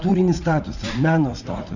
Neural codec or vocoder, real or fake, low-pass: vocoder, 44.1 kHz, 80 mel bands, Vocos; fake; 7.2 kHz